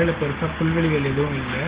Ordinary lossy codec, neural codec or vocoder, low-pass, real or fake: Opus, 16 kbps; none; 3.6 kHz; real